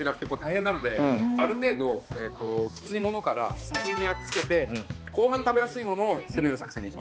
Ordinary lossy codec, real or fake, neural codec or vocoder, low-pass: none; fake; codec, 16 kHz, 2 kbps, X-Codec, HuBERT features, trained on balanced general audio; none